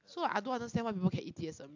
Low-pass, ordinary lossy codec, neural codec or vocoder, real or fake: 7.2 kHz; AAC, 48 kbps; none; real